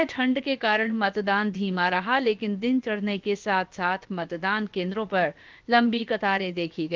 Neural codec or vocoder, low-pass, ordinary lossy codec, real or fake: codec, 16 kHz, about 1 kbps, DyCAST, with the encoder's durations; 7.2 kHz; Opus, 32 kbps; fake